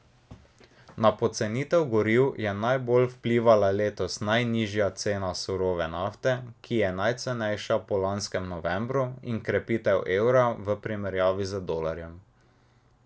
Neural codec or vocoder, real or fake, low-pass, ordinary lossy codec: none; real; none; none